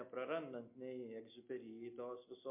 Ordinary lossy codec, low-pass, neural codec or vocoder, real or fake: MP3, 24 kbps; 3.6 kHz; none; real